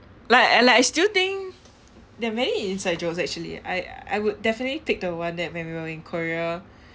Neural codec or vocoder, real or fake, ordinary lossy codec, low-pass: none; real; none; none